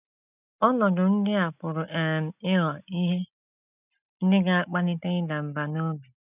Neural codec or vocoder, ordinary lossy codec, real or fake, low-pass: none; none; real; 3.6 kHz